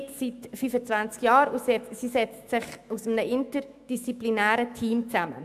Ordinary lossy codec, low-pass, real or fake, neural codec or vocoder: AAC, 96 kbps; 14.4 kHz; fake; autoencoder, 48 kHz, 128 numbers a frame, DAC-VAE, trained on Japanese speech